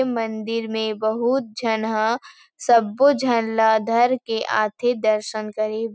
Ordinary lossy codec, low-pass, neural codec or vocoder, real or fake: none; none; none; real